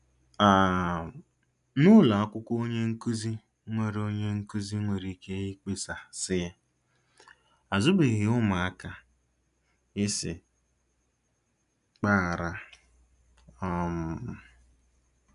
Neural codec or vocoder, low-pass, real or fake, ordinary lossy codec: none; 10.8 kHz; real; none